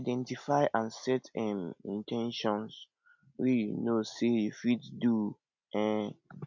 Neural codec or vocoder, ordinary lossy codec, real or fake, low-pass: none; MP3, 64 kbps; real; 7.2 kHz